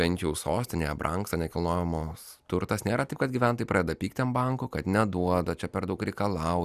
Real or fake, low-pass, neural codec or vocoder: real; 14.4 kHz; none